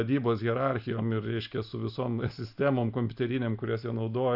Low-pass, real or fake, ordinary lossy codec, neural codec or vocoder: 5.4 kHz; real; AAC, 48 kbps; none